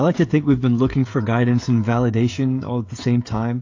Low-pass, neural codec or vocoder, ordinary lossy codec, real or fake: 7.2 kHz; codec, 16 kHz, 16 kbps, FreqCodec, smaller model; AAC, 48 kbps; fake